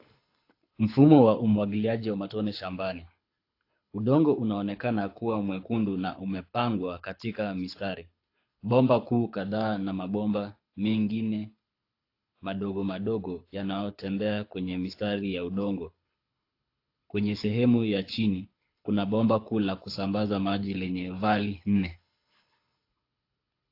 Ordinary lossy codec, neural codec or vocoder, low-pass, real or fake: AAC, 32 kbps; codec, 24 kHz, 6 kbps, HILCodec; 5.4 kHz; fake